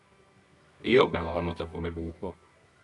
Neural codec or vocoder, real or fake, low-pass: codec, 24 kHz, 0.9 kbps, WavTokenizer, medium music audio release; fake; 10.8 kHz